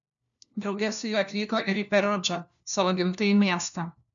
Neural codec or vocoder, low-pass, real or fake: codec, 16 kHz, 1 kbps, FunCodec, trained on LibriTTS, 50 frames a second; 7.2 kHz; fake